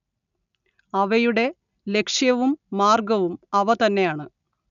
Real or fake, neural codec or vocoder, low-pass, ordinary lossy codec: real; none; 7.2 kHz; none